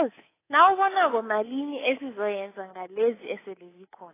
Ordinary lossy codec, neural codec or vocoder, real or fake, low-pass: AAC, 16 kbps; codec, 24 kHz, 3.1 kbps, DualCodec; fake; 3.6 kHz